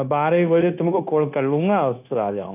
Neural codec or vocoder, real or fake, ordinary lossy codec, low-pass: codec, 16 kHz, 0.9 kbps, LongCat-Audio-Codec; fake; none; 3.6 kHz